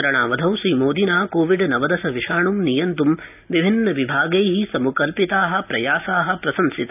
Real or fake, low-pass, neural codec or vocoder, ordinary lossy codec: real; 3.6 kHz; none; none